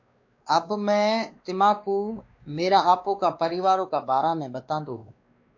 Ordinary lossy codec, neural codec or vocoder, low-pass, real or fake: AAC, 48 kbps; codec, 16 kHz, 2 kbps, X-Codec, WavLM features, trained on Multilingual LibriSpeech; 7.2 kHz; fake